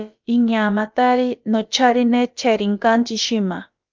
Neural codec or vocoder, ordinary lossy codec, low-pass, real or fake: codec, 16 kHz, about 1 kbps, DyCAST, with the encoder's durations; Opus, 24 kbps; 7.2 kHz; fake